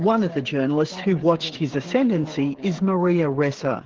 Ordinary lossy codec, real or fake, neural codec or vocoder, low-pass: Opus, 16 kbps; fake; codec, 16 kHz, 8 kbps, FreqCodec, smaller model; 7.2 kHz